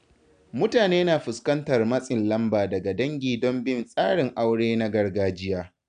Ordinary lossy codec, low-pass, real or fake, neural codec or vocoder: none; 9.9 kHz; real; none